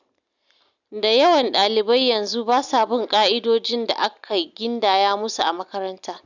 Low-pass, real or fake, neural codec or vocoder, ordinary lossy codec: 7.2 kHz; real; none; none